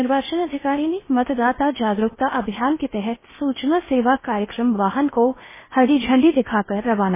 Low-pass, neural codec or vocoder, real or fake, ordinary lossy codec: 3.6 kHz; codec, 16 kHz in and 24 kHz out, 0.8 kbps, FocalCodec, streaming, 65536 codes; fake; MP3, 16 kbps